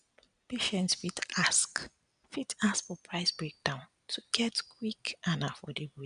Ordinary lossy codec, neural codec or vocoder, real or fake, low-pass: none; none; real; 9.9 kHz